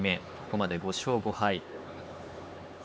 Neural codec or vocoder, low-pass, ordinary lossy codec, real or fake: codec, 16 kHz, 4 kbps, X-Codec, HuBERT features, trained on LibriSpeech; none; none; fake